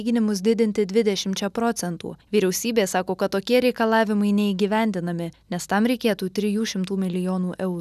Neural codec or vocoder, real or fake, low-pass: none; real; 14.4 kHz